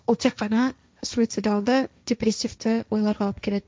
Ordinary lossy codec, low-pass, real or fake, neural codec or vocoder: none; none; fake; codec, 16 kHz, 1.1 kbps, Voila-Tokenizer